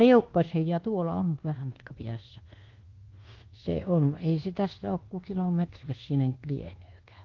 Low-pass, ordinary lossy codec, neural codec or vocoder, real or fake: 7.2 kHz; Opus, 16 kbps; codec, 24 kHz, 1.2 kbps, DualCodec; fake